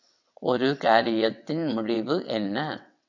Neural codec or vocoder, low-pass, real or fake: vocoder, 22.05 kHz, 80 mel bands, WaveNeXt; 7.2 kHz; fake